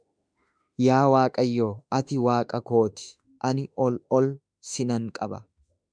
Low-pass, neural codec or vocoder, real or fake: 9.9 kHz; autoencoder, 48 kHz, 32 numbers a frame, DAC-VAE, trained on Japanese speech; fake